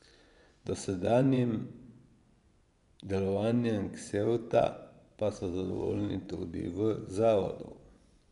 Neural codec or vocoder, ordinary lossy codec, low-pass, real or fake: vocoder, 24 kHz, 100 mel bands, Vocos; none; 10.8 kHz; fake